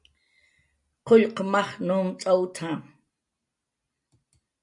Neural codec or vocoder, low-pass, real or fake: none; 10.8 kHz; real